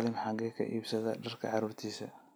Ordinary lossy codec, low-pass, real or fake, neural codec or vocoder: none; none; real; none